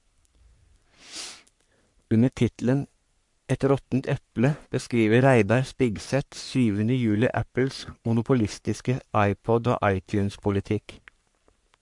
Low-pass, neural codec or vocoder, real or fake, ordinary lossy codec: 10.8 kHz; codec, 44.1 kHz, 3.4 kbps, Pupu-Codec; fake; MP3, 64 kbps